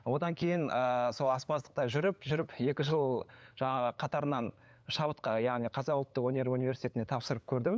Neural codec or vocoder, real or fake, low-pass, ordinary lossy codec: codec, 16 kHz, 8 kbps, FunCodec, trained on LibriTTS, 25 frames a second; fake; none; none